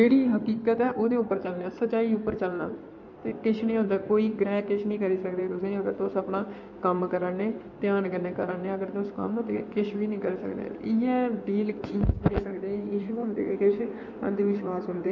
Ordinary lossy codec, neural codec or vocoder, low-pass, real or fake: MP3, 48 kbps; codec, 16 kHz in and 24 kHz out, 2.2 kbps, FireRedTTS-2 codec; 7.2 kHz; fake